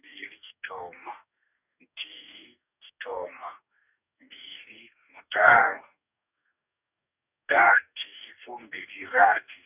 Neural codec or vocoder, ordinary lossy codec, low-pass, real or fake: codec, 44.1 kHz, 2.6 kbps, DAC; none; 3.6 kHz; fake